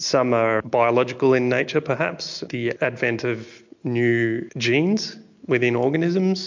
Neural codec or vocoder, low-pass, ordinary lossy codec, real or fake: none; 7.2 kHz; MP3, 48 kbps; real